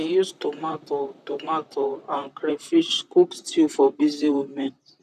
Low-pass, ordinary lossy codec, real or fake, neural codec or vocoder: 14.4 kHz; none; fake; vocoder, 44.1 kHz, 128 mel bands, Pupu-Vocoder